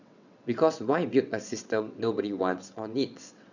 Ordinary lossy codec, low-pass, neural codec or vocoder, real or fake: none; 7.2 kHz; vocoder, 22.05 kHz, 80 mel bands, WaveNeXt; fake